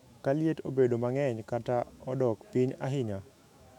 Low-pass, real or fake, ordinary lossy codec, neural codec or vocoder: 19.8 kHz; fake; none; autoencoder, 48 kHz, 128 numbers a frame, DAC-VAE, trained on Japanese speech